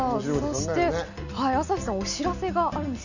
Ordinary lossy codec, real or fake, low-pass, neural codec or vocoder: none; real; 7.2 kHz; none